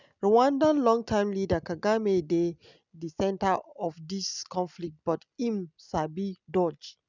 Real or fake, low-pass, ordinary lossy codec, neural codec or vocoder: real; 7.2 kHz; none; none